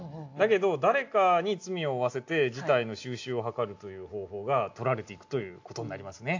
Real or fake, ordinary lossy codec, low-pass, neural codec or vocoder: real; AAC, 48 kbps; 7.2 kHz; none